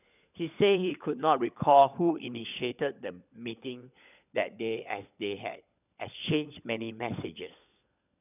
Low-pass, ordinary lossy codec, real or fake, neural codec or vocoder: 3.6 kHz; none; fake; codec, 16 kHz, 16 kbps, FunCodec, trained on LibriTTS, 50 frames a second